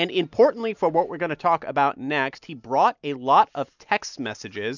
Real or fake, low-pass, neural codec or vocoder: real; 7.2 kHz; none